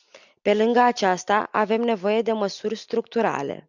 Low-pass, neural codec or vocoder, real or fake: 7.2 kHz; none; real